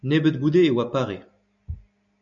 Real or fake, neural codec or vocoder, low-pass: real; none; 7.2 kHz